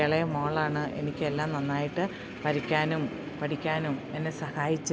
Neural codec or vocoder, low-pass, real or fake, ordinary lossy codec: none; none; real; none